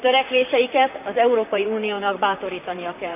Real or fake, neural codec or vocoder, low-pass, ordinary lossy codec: fake; vocoder, 44.1 kHz, 128 mel bands, Pupu-Vocoder; 3.6 kHz; none